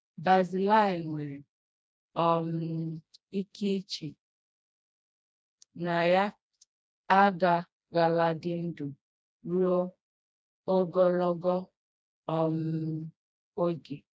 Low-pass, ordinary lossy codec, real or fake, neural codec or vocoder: none; none; fake; codec, 16 kHz, 1 kbps, FreqCodec, smaller model